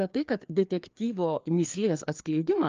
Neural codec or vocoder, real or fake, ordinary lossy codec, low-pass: codec, 16 kHz, 2 kbps, FreqCodec, larger model; fake; Opus, 24 kbps; 7.2 kHz